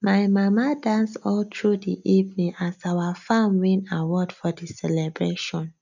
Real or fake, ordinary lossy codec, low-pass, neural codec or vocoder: real; none; 7.2 kHz; none